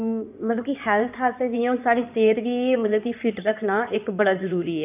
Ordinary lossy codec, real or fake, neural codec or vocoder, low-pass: none; fake; codec, 16 kHz in and 24 kHz out, 2.2 kbps, FireRedTTS-2 codec; 3.6 kHz